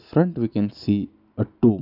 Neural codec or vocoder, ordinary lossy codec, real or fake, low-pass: none; none; real; 5.4 kHz